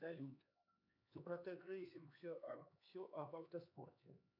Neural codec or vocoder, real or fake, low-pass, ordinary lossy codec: codec, 16 kHz, 4 kbps, X-Codec, HuBERT features, trained on LibriSpeech; fake; 5.4 kHz; AAC, 48 kbps